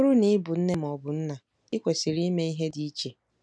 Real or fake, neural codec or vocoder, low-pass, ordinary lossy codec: real; none; none; none